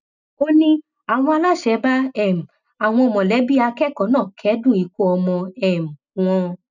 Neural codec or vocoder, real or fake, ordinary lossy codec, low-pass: none; real; none; 7.2 kHz